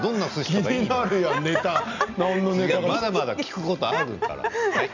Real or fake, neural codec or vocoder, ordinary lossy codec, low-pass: real; none; none; 7.2 kHz